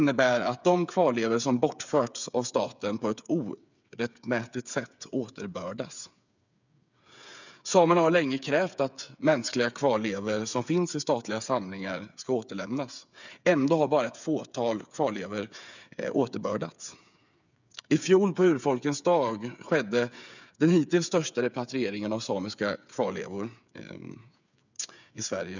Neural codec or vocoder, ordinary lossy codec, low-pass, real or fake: codec, 16 kHz, 8 kbps, FreqCodec, smaller model; none; 7.2 kHz; fake